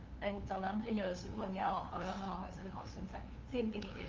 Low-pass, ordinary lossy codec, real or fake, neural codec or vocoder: 7.2 kHz; Opus, 32 kbps; fake; codec, 16 kHz, 2 kbps, FunCodec, trained on LibriTTS, 25 frames a second